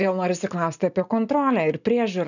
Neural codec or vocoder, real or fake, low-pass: none; real; 7.2 kHz